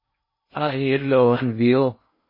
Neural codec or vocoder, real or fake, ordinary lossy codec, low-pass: codec, 16 kHz in and 24 kHz out, 0.6 kbps, FocalCodec, streaming, 2048 codes; fake; MP3, 24 kbps; 5.4 kHz